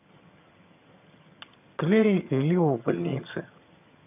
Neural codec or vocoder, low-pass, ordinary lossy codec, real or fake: vocoder, 22.05 kHz, 80 mel bands, HiFi-GAN; 3.6 kHz; AAC, 32 kbps; fake